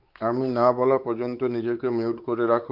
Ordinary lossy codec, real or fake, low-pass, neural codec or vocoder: Opus, 24 kbps; fake; 5.4 kHz; codec, 16 kHz, 4 kbps, X-Codec, WavLM features, trained on Multilingual LibriSpeech